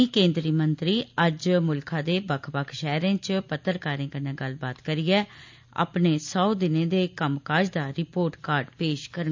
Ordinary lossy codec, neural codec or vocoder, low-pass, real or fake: MP3, 64 kbps; none; 7.2 kHz; real